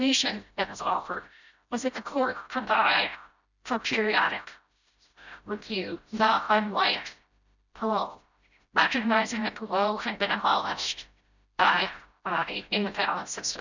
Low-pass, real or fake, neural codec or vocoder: 7.2 kHz; fake; codec, 16 kHz, 0.5 kbps, FreqCodec, smaller model